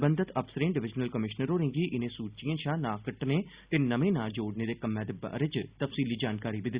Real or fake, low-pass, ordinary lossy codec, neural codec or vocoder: real; 3.6 kHz; Opus, 64 kbps; none